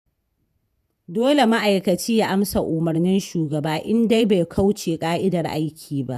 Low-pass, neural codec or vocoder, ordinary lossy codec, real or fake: 14.4 kHz; vocoder, 48 kHz, 128 mel bands, Vocos; none; fake